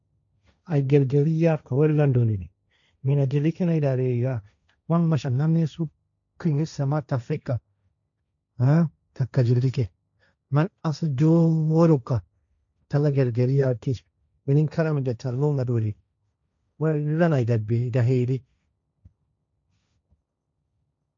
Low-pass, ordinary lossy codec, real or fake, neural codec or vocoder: 7.2 kHz; none; fake; codec, 16 kHz, 1.1 kbps, Voila-Tokenizer